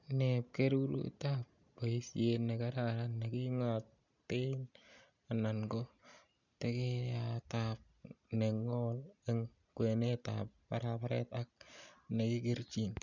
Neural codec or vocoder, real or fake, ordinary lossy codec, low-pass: none; real; none; 7.2 kHz